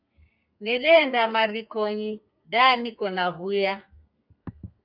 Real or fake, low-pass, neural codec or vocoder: fake; 5.4 kHz; codec, 32 kHz, 1.9 kbps, SNAC